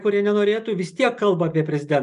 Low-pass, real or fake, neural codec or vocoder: 10.8 kHz; real; none